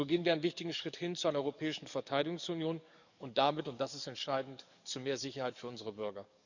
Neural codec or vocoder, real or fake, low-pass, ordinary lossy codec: codec, 44.1 kHz, 7.8 kbps, DAC; fake; 7.2 kHz; none